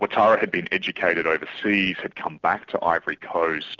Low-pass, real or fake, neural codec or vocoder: 7.2 kHz; real; none